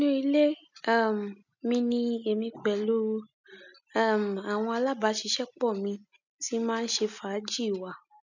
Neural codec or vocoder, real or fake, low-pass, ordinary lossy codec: none; real; 7.2 kHz; none